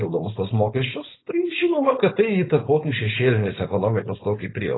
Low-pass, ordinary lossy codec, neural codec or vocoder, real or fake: 7.2 kHz; AAC, 16 kbps; codec, 16 kHz, 4.8 kbps, FACodec; fake